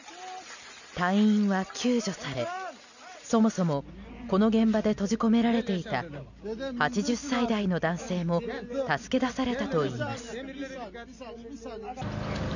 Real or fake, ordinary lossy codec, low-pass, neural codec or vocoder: real; none; 7.2 kHz; none